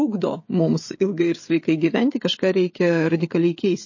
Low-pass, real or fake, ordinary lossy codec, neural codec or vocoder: 7.2 kHz; fake; MP3, 32 kbps; codec, 16 kHz, 16 kbps, FreqCodec, smaller model